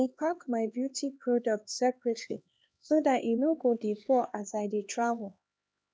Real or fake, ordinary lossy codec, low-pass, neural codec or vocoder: fake; none; none; codec, 16 kHz, 2 kbps, X-Codec, HuBERT features, trained on LibriSpeech